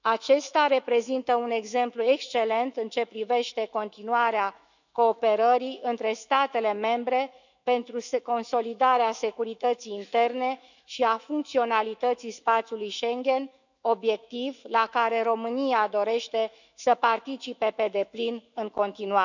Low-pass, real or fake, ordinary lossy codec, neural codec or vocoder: 7.2 kHz; fake; none; autoencoder, 48 kHz, 128 numbers a frame, DAC-VAE, trained on Japanese speech